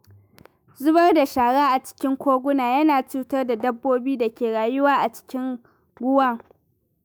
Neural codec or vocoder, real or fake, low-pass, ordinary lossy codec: autoencoder, 48 kHz, 128 numbers a frame, DAC-VAE, trained on Japanese speech; fake; none; none